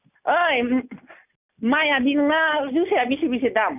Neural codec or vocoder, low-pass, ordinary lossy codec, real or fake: none; 3.6 kHz; none; real